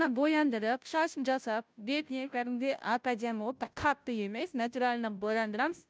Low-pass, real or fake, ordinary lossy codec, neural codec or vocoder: none; fake; none; codec, 16 kHz, 0.5 kbps, FunCodec, trained on Chinese and English, 25 frames a second